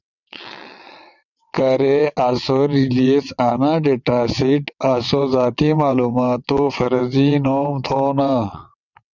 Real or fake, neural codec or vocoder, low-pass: fake; vocoder, 22.05 kHz, 80 mel bands, WaveNeXt; 7.2 kHz